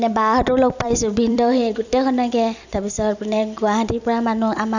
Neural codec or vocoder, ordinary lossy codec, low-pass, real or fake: vocoder, 44.1 kHz, 128 mel bands every 256 samples, BigVGAN v2; none; 7.2 kHz; fake